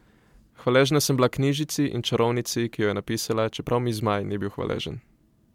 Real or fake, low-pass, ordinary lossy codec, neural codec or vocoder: real; 19.8 kHz; MP3, 96 kbps; none